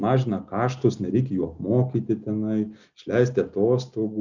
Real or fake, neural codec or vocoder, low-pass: real; none; 7.2 kHz